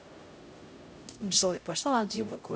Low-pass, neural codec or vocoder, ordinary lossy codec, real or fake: none; codec, 16 kHz, 0.5 kbps, X-Codec, HuBERT features, trained on LibriSpeech; none; fake